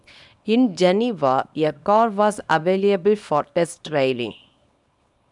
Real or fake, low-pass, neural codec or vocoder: fake; 10.8 kHz; codec, 24 kHz, 0.9 kbps, WavTokenizer, small release